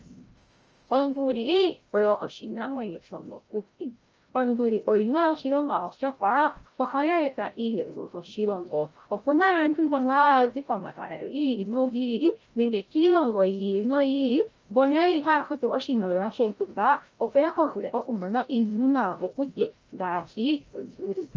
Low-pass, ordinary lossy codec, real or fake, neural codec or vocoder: 7.2 kHz; Opus, 24 kbps; fake; codec, 16 kHz, 0.5 kbps, FreqCodec, larger model